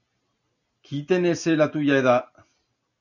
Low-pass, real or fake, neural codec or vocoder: 7.2 kHz; real; none